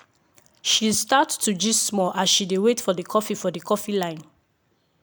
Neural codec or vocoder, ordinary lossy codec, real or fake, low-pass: none; none; real; none